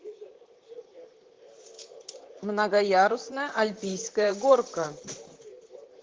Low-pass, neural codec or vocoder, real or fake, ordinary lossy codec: 7.2 kHz; vocoder, 44.1 kHz, 128 mel bands, Pupu-Vocoder; fake; Opus, 16 kbps